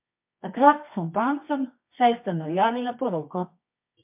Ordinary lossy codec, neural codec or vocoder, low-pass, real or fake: MP3, 32 kbps; codec, 24 kHz, 0.9 kbps, WavTokenizer, medium music audio release; 3.6 kHz; fake